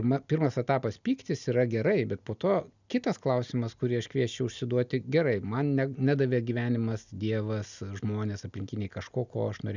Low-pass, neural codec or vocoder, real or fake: 7.2 kHz; none; real